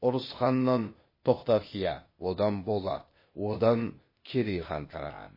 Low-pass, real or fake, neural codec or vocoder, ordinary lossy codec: 5.4 kHz; fake; codec, 16 kHz, 0.8 kbps, ZipCodec; MP3, 24 kbps